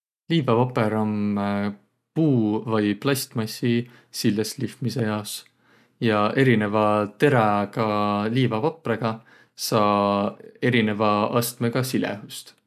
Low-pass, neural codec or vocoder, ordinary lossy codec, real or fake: 14.4 kHz; none; none; real